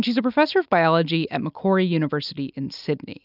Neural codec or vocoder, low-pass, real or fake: none; 5.4 kHz; real